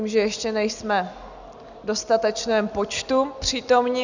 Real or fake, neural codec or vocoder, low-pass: real; none; 7.2 kHz